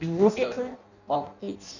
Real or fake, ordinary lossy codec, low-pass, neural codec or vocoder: fake; none; 7.2 kHz; codec, 16 kHz in and 24 kHz out, 0.6 kbps, FireRedTTS-2 codec